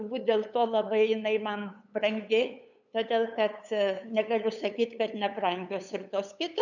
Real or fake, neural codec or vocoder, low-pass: fake; codec, 16 kHz, 8 kbps, FunCodec, trained on LibriTTS, 25 frames a second; 7.2 kHz